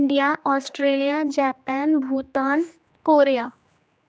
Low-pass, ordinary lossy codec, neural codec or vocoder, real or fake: none; none; codec, 16 kHz, 2 kbps, X-Codec, HuBERT features, trained on general audio; fake